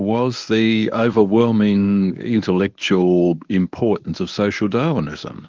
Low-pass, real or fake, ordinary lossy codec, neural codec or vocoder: 7.2 kHz; fake; Opus, 32 kbps; codec, 24 kHz, 0.9 kbps, WavTokenizer, medium speech release version 1